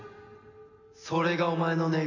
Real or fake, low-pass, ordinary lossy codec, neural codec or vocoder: real; 7.2 kHz; none; none